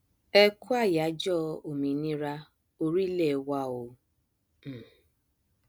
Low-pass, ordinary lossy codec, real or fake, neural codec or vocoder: none; none; real; none